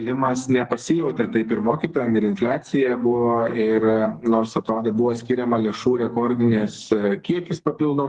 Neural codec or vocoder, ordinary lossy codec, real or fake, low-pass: codec, 44.1 kHz, 2.6 kbps, SNAC; Opus, 24 kbps; fake; 10.8 kHz